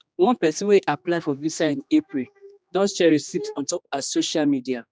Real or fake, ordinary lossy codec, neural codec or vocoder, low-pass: fake; none; codec, 16 kHz, 2 kbps, X-Codec, HuBERT features, trained on general audio; none